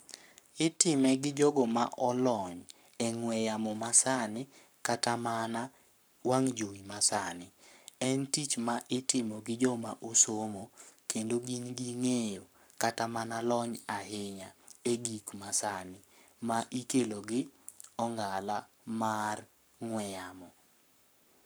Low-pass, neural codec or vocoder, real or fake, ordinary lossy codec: none; codec, 44.1 kHz, 7.8 kbps, Pupu-Codec; fake; none